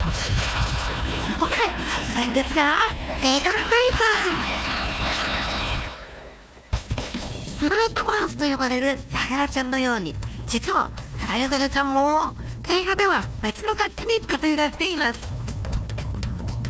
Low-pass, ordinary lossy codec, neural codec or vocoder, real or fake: none; none; codec, 16 kHz, 1 kbps, FunCodec, trained on Chinese and English, 50 frames a second; fake